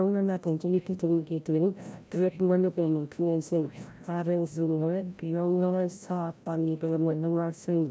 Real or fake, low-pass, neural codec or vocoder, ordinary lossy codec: fake; none; codec, 16 kHz, 0.5 kbps, FreqCodec, larger model; none